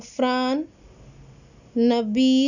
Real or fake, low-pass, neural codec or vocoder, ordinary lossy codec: real; 7.2 kHz; none; none